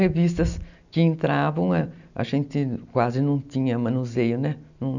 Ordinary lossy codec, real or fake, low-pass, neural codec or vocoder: none; real; 7.2 kHz; none